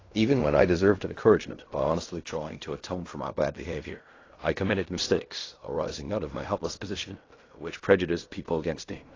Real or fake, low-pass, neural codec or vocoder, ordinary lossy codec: fake; 7.2 kHz; codec, 16 kHz in and 24 kHz out, 0.4 kbps, LongCat-Audio-Codec, fine tuned four codebook decoder; AAC, 32 kbps